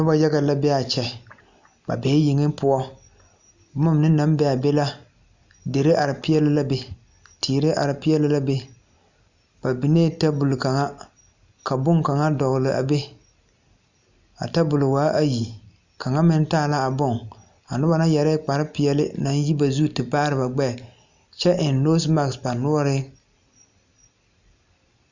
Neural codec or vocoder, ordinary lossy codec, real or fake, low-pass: none; Opus, 64 kbps; real; 7.2 kHz